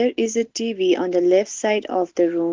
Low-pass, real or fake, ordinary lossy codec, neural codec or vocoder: 7.2 kHz; real; Opus, 16 kbps; none